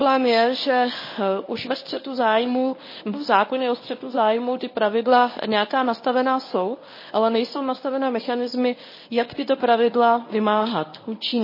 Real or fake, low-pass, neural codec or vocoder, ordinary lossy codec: fake; 5.4 kHz; codec, 24 kHz, 0.9 kbps, WavTokenizer, medium speech release version 1; MP3, 24 kbps